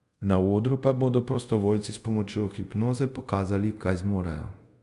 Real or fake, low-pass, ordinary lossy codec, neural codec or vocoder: fake; 10.8 kHz; Opus, 64 kbps; codec, 24 kHz, 0.5 kbps, DualCodec